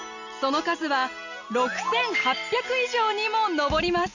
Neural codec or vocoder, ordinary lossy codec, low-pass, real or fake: none; none; 7.2 kHz; real